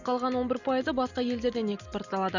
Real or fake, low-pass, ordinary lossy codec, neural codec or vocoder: real; 7.2 kHz; none; none